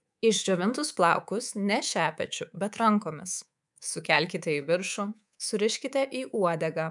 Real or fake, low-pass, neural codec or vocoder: fake; 10.8 kHz; codec, 24 kHz, 3.1 kbps, DualCodec